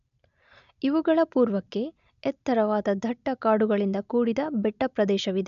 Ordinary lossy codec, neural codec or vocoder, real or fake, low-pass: none; none; real; 7.2 kHz